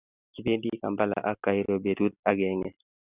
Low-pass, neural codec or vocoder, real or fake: 3.6 kHz; none; real